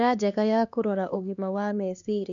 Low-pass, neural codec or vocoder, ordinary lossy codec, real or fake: 7.2 kHz; codec, 16 kHz, 2 kbps, X-Codec, HuBERT features, trained on LibriSpeech; none; fake